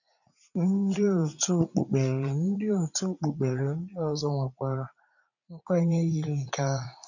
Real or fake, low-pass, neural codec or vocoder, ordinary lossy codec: fake; 7.2 kHz; vocoder, 44.1 kHz, 80 mel bands, Vocos; none